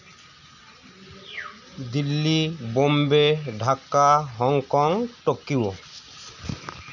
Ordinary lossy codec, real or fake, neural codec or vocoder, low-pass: none; real; none; 7.2 kHz